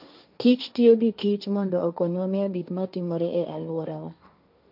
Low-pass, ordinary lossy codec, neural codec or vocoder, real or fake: 5.4 kHz; none; codec, 16 kHz, 1.1 kbps, Voila-Tokenizer; fake